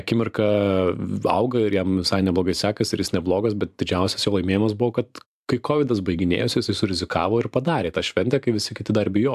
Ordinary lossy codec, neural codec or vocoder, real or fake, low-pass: AAC, 96 kbps; vocoder, 44.1 kHz, 128 mel bands every 512 samples, BigVGAN v2; fake; 14.4 kHz